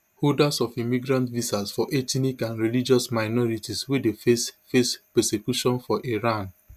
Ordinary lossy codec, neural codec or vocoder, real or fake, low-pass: none; none; real; 14.4 kHz